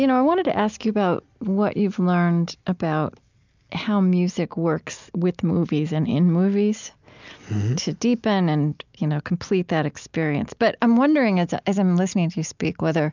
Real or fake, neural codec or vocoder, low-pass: real; none; 7.2 kHz